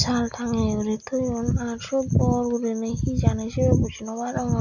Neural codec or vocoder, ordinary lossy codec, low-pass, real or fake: none; none; 7.2 kHz; real